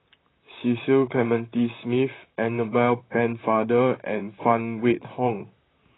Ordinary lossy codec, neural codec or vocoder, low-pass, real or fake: AAC, 16 kbps; vocoder, 44.1 kHz, 128 mel bands, Pupu-Vocoder; 7.2 kHz; fake